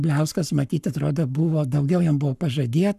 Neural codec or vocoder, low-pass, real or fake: codec, 44.1 kHz, 7.8 kbps, Pupu-Codec; 14.4 kHz; fake